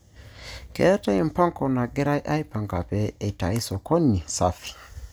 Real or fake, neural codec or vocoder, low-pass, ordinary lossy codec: real; none; none; none